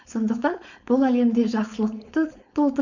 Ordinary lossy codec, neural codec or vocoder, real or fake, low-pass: none; codec, 16 kHz, 4.8 kbps, FACodec; fake; 7.2 kHz